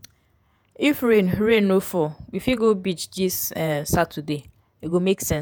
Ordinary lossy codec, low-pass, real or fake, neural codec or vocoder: none; none; fake; vocoder, 48 kHz, 128 mel bands, Vocos